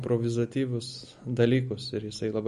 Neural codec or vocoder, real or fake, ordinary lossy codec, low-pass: none; real; MP3, 48 kbps; 14.4 kHz